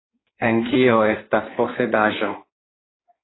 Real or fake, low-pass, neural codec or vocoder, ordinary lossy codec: fake; 7.2 kHz; codec, 16 kHz in and 24 kHz out, 1.1 kbps, FireRedTTS-2 codec; AAC, 16 kbps